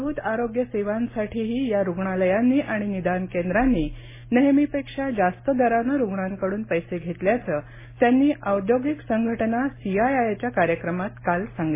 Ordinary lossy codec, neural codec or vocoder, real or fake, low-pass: MP3, 16 kbps; none; real; 3.6 kHz